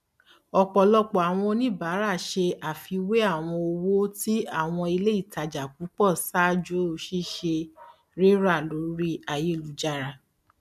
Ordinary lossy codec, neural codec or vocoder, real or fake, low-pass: MP3, 96 kbps; none; real; 14.4 kHz